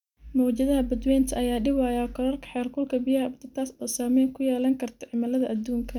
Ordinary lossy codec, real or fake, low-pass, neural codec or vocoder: none; real; 19.8 kHz; none